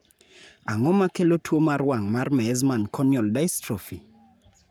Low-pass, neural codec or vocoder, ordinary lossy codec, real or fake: none; codec, 44.1 kHz, 7.8 kbps, Pupu-Codec; none; fake